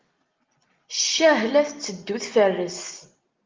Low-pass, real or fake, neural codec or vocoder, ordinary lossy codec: 7.2 kHz; real; none; Opus, 32 kbps